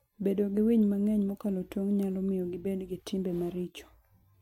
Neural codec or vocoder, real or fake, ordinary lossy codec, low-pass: none; real; MP3, 64 kbps; 19.8 kHz